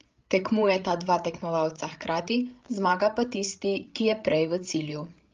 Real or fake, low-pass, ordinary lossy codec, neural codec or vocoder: fake; 7.2 kHz; Opus, 32 kbps; codec, 16 kHz, 8 kbps, FreqCodec, larger model